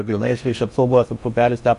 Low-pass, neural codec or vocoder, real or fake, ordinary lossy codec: 10.8 kHz; codec, 16 kHz in and 24 kHz out, 0.6 kbps, FocalCodec, streaming, 4096 codes; fake; AAC, 96 kbps